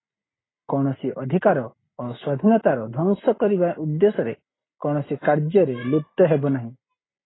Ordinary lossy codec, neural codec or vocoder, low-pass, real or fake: AAC, 16 kbps; none; 7.2 kHz; real